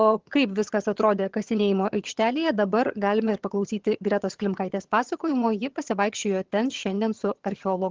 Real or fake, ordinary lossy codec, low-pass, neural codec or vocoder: fake; Opus, 16 kbps; 7.2 kHz; vocoder, 22.05 kHz, 80 mel bands, HiFi-GAN